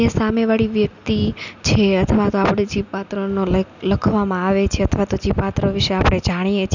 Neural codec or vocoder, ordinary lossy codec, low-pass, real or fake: none; none; 7.2 kHz; real